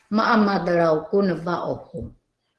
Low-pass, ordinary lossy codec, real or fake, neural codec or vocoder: 10.8 kHz; Opus, 16 kbps; real; none